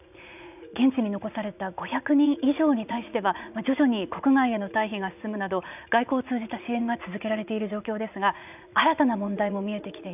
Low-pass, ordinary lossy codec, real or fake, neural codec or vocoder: 3.6 kHz; none; fake; vocoder, 44.1 kHz, 80 mel bands, Vocos